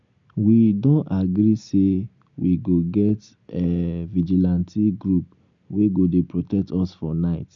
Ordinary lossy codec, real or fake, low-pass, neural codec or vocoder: none; real; 7.2 kHz; none